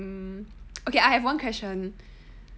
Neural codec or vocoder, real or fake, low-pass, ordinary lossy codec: none; real; none; none